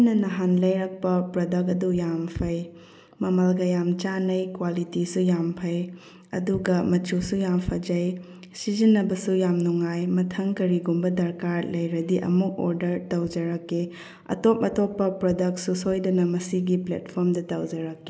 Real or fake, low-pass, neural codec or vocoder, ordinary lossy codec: real; none; none; none